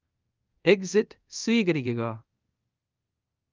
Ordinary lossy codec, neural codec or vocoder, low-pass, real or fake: Opus, 24 kbps; codec, 24 kHz, 0.5 kbps, DualCodec; 7.2 kHz; fake